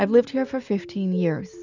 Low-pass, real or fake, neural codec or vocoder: 7.2 kHz; real; none